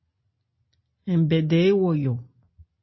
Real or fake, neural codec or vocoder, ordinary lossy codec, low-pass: real; none; MP3, 24 kbps; 7.2 kHz